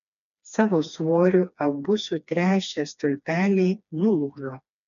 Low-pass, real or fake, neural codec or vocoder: 7.2 kHz; fake; codec, 16 kHz, 2 kbps, FreqCodec, smaller model